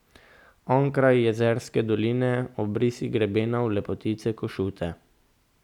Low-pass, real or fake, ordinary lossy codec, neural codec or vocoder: 19.8 kHz; real; none; none